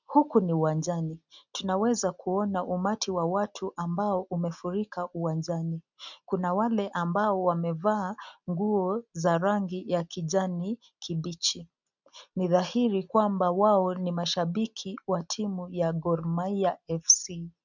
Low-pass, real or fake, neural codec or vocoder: 7.2 kHz; real; none